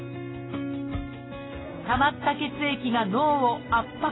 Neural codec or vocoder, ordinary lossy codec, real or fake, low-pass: none; AAC, 16 kbps; real; 7.2 kHz